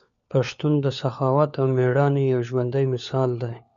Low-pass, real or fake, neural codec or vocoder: 7.2 kHz; fake; codec, 16 kHz, 4 kbps, FunCodec, trained on LibriTTS, 50 frames a second